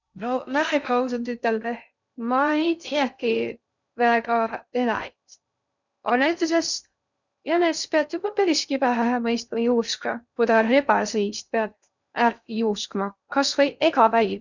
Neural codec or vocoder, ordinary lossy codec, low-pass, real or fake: codec, 16 kHz in and 24 kHz out, 0.6 kbps, FocalCodec, streaming, 2048 codes; none; 7.2 kHz; fake